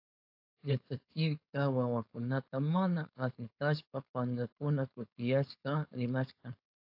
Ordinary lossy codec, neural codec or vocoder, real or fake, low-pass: AAC, 48 kbps; codec, 16 kHz, 4.8 kbps, FACodec; fake; 5.4 kHz